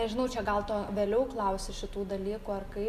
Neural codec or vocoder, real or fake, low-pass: none; real; 14.4 kHz